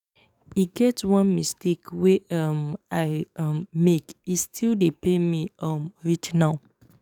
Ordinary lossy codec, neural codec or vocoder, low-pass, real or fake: none; codec, 44.1 kHz, 7.8 kbps, DAC; 19.8 kHz; fake